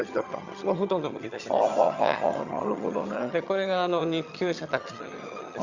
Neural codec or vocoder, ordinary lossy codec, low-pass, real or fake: vocoder, 22.05 kHz, 80 mel bands, HiFi-GAN; Opus, 64 kbps; 7.2 kHz; fake